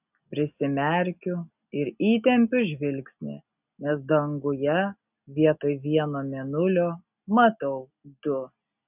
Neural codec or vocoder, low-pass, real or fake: none; 3.6 kHz; real